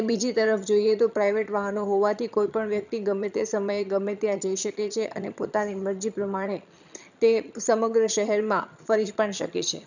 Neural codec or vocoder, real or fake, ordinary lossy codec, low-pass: vocoder, 22.05 kHz, 80 mel bands, HiFi-GAN; fake; none; 7.2 kHz